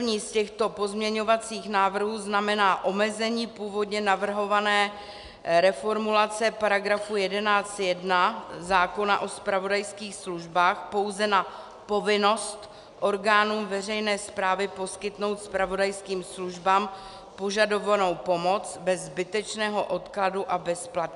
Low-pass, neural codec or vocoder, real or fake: 10.8 kHz; none; real